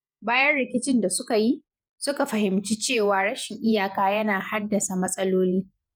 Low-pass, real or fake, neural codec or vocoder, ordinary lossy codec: none; fake; vocoder, 48 kHz, 128 mel bands, Vocos; none